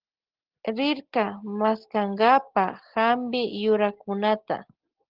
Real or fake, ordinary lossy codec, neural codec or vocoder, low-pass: real; Opus, 16 kbps; none; 5.4 kHz